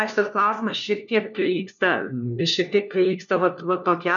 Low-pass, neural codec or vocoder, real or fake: 7.2 kHz; codec, 16 kHz, 1 kbps, FunCodec, trained on LibriTTS, 50 frames a second; fake